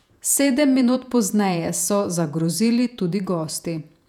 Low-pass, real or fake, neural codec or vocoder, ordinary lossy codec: 19.8 kHz; fake; vocoder, 48 kHz, 128 mel bands, Vocos; none